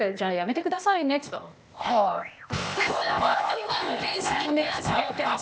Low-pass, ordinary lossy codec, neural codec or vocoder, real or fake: none; none; codec, 16 kHz, 0.8 kbps, ZipCodec; fake